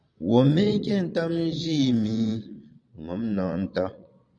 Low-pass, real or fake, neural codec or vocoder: 9.9 kHz; fake; vocoder, 22.05 kHz, 80 mel bands, Vocos